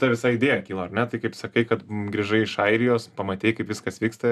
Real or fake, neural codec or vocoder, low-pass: real; none; 14.4 kHz